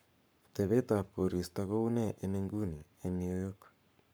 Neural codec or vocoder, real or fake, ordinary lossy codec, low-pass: codec, 44.1 kHz, 7.8 kbps, Pupu-Codec; fake; none; none